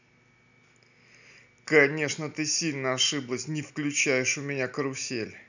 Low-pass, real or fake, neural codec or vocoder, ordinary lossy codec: 7.2 kHz; real; none; none